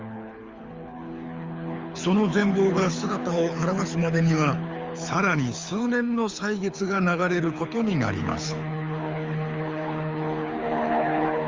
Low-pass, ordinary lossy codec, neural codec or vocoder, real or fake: 7.2 kHz; Opus, 32 kbps; codec, 24 kHz, 6 kbps, HILCodec; fake